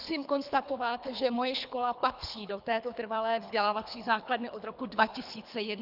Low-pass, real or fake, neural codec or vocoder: 5.4 kHz; fake; codec, 24 kHz, 3 kbps, HILCodec